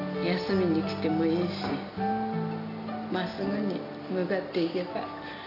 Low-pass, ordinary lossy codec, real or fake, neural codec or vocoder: 5.4 kHz; none; real; none